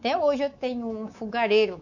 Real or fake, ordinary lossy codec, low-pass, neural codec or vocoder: fake; none; 7.2 kHz; codec, 24 kHz, 3.1 kbps, DualCodec